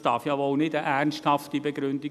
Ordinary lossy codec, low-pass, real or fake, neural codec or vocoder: none; 14.4 kHz; real; none